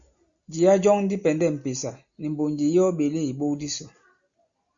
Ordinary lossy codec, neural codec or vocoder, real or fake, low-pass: Opus, 64 kbps; none; real; 7.2 kHz